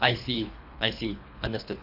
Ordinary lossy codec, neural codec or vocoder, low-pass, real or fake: none; codec, 24 kHz, 3 kbps, HILCodec; 5.4 kHz; fake